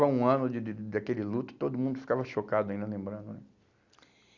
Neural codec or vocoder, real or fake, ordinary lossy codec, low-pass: none; real; Opus, 64 kbps; 7.2 kHz